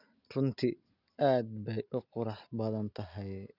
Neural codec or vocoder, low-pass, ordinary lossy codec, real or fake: none; 5.4 kHz; Opus, 64 kbps; real